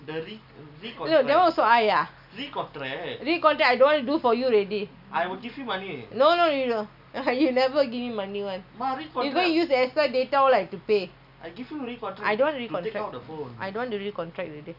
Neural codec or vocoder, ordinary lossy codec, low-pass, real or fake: none; none; 5.4 kHz; real